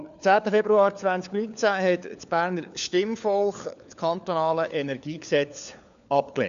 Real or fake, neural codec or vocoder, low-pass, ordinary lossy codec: fake; codec, 16 kHz, 4 kbps, FunCodec, trained on LibriTTS, 50 frames a second; 7.2 kHz; none